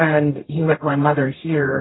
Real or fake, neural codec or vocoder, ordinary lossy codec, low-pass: fake; codec, 44.1 kHz, 0.9 kbps, DAC; AAC, 16 kbps; 7.2 kHz